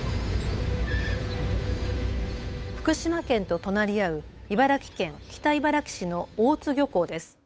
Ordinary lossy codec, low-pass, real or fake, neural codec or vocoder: none; none; fake; codec, 16 kHz, 8 kbps, FunCodec, trained on Chinese and English, 25 frames a second